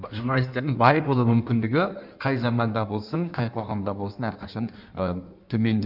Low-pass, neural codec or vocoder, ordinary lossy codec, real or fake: 5.4 kHz; codec, 16 kHz in and 24 kHz out, 1.1 kbps, FireRedTTS-2 codec; none; fake